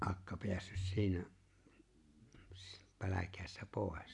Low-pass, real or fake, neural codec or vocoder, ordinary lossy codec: 10.8 kHz; real; none; AAC, 64 kbps